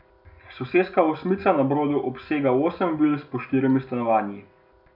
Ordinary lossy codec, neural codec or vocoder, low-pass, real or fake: none; none; 5.4 kHz; real